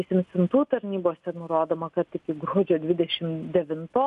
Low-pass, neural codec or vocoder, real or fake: 10.8 kHz; none; real